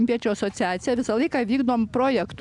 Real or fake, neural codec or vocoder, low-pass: real; none; 10.8 kHz